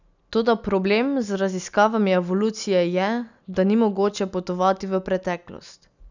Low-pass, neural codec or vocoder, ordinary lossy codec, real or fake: 7.2 kHz; none; none; real